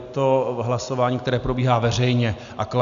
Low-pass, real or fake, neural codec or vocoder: 7.2 kHz; real; none